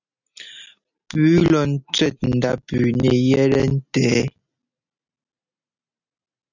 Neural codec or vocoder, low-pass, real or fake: none; 7.2 kHz; real